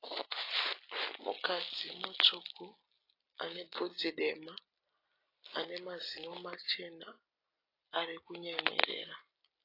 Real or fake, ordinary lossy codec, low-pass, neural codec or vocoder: real; AAC, 32 kbps; 5.4 kHz; none